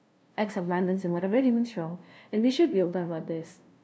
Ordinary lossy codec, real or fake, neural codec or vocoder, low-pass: none; fake; codec, 16 kHz, 0.5 kbps, FunCodec, trained on LibriTTS, 25 frames a second; none